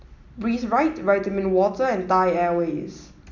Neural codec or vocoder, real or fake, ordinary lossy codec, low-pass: none; real; none; 7.2 kHz